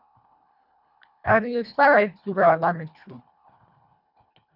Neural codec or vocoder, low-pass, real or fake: codec, 24 kHz, 1.5 kbps, HILCodec; 5.4 kHz; fake